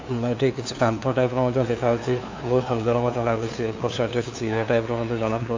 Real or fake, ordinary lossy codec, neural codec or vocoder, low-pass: fake; AAC, 48 kbps; codec, 16 kHz, 2 kbps, FunCodec, trained on LibriTTS, 25 frames a second; 7.2 kHz